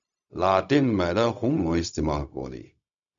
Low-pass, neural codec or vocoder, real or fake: 7.2 kHz; codec, 16 kHz, 0.4 kbps, LongCat-Audio-Codec; fake